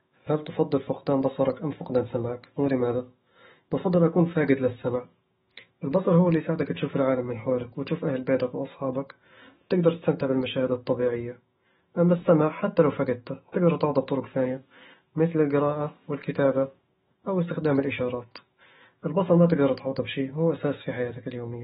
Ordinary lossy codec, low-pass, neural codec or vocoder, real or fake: AAC, 16 kbps; 19.8 kHz; autoencoder, 48 kHz, 128 numbers a frame, DAC-VAE, trained on Japanese speech; fake